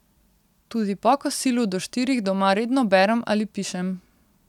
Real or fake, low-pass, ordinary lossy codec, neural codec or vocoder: real; 19.8 kHz; none; none